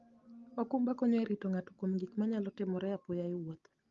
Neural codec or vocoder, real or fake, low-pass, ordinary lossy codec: none; real; 7.2 kHz; Opus, 32 kbps